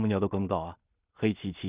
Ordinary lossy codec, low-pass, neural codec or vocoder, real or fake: Opus, 64 kbps; 3.6 kHz; codec, 16 kHz in and 24 kHz out, 0.4 kbps, LongCat-Audio-Codec, two codebook decoder; fake